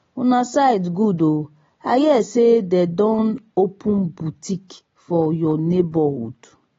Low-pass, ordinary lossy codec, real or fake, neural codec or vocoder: 7.2 kHz; AAC, 24 kbps; real; none